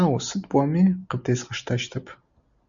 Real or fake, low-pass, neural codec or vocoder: real; 7.2 kHz; none